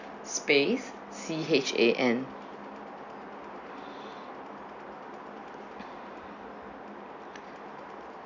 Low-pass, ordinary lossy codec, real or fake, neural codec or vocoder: 7.2 kHz; none; real; none